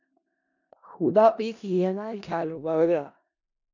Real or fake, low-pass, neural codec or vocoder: fake; 7.2 kHz; codec, 16 kHz in and 24 kHz out, 0.4 kbps, LongCat-Audio-Codec, four codebook decoder